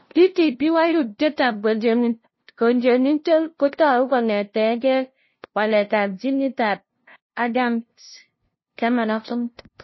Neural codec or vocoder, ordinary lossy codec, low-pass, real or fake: codec, 16 kHz, 0.5 kbps, FunCodec, trained on LibriTTS, 25 frames a second; MP3, 24 kbps; 7.2 kHz; fake